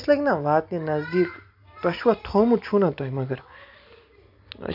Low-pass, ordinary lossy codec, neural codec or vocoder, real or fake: 5.4 kHz; AAC, 48 kbps; none; real